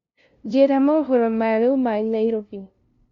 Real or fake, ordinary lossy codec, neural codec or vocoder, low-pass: fake; Opus, 64 kbps; codec, 16 kHz, 0.5 kbps, FunCodec, trained on LibriTTS, 25 frames a second; 7.2 kHz